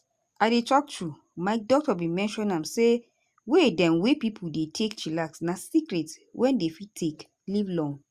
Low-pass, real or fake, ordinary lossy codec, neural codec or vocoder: 14.4 kHz; real; Opus, 64 kbps; none